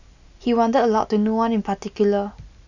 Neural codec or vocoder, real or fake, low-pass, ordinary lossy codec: none; real; 7.2 kHz; none